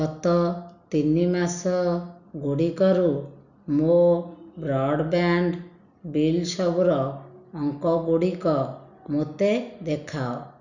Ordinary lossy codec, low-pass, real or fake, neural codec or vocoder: none; 7.2 kHz; real; none